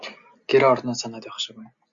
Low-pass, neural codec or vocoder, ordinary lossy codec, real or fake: 7.2 kHz; none; Opus, 64 kbps; real